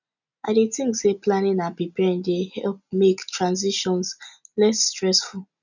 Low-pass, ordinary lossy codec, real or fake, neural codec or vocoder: 7.2 kHz; none; real; none